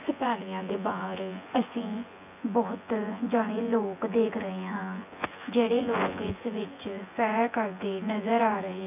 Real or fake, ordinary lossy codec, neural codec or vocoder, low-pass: fake; none; vocoder, 24 kHz, 100 mel bands, Vocos; 3.6 kHz